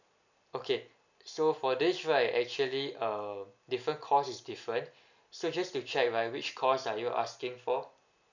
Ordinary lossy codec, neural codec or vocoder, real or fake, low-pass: none; none; real; 7.2 kHz